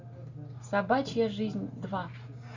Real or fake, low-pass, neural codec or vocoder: real; 7.2 kHz; none